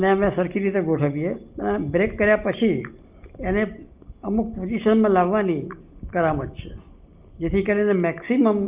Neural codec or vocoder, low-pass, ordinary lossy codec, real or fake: none; 3.6 kHz; Opus, 24 kbps; real